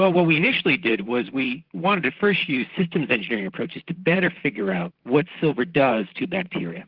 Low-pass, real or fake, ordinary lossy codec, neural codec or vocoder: 5.4 kHz; fake; Opus, 16 kbps; vocoder, 44.1 kHz, 128 mel bands, Pupu-Vocoder